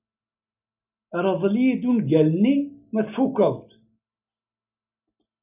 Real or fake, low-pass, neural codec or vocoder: real; 3.6 kHz; none